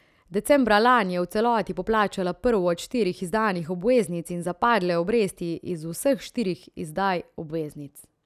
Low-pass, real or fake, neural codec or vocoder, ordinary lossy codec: 14.4 kHz; real; none; none